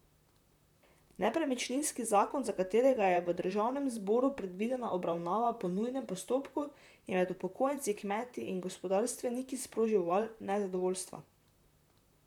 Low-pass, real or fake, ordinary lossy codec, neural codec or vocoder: 19.8 kHz; fake; none; vocoder, 44.1 kHz, 128 mel bands, Pupu-Vocoder